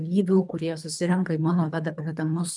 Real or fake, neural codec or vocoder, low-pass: fake; codec, 24 kHz, 3 kbps, HILCodec; 10.8 kHz